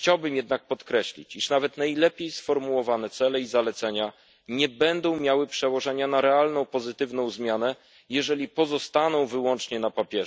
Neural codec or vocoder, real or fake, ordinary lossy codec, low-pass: none; real; none; none